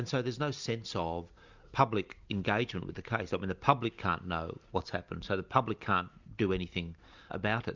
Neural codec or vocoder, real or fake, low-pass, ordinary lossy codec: none; real; 7.2 kHz; Opus, 64 kbps